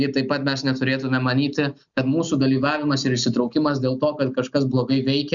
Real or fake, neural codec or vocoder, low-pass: real; none; 7.2 kHz